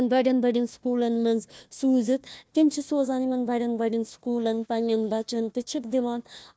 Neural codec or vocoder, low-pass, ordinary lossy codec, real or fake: codec, 16 kHz, 1 kbps, FunCodec, trained on Chinese and English, 50 frames a second; none; none; fake